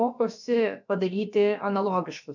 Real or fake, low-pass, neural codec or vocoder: fake; 7.2 kHz; codec, 16 kHz, about 1 kbps, DyCAST, with the encoder's durations